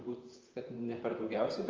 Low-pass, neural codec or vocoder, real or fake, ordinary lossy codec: 7.2 kHz; vocoder, 44.1 kHz, 128 mel bands, Pupu-Vocoder; fake; Opus, 24 kbps